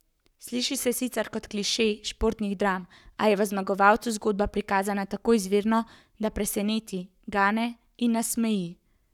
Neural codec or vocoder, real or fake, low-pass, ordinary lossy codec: codec, 44.1 kHz, 7.8 kbps, Pupu-Codec; fake; 19.8 kHz; none